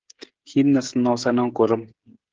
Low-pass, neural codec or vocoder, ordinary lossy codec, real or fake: 7.2 kHz; codec, 16 kHz, 16 kbps, FreqCodec, smaller model; Opus, 16 kbps; fake